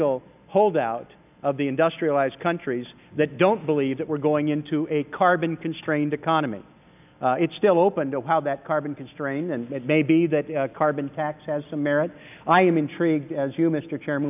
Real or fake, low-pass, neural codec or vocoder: real; 3.6 kHz; none